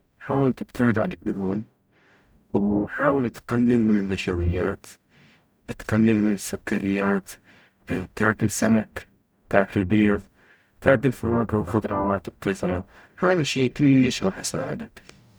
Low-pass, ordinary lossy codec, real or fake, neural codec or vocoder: none; none; fake; codec, 44.1 kHz, 0.9 kbps, DAC